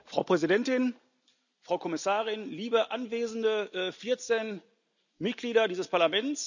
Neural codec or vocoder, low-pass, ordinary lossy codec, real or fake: none; 7.2 kHz; none; real